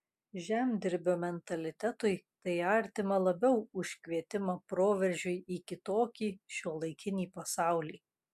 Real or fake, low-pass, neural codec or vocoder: real; 14.4 kHz; none